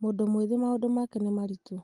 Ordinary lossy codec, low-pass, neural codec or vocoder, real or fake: Opus, 24 kbps; 10.8 kHz; none; real